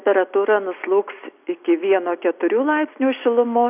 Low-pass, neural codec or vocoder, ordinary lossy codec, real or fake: 3.6 kHz; none; AAC, 32 kbps; real